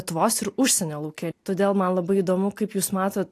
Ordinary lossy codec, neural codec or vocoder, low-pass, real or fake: AAC, 64 kbps; none; 14.4 kHz; real